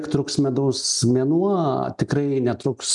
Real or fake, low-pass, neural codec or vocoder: real; 10.8 kHz; none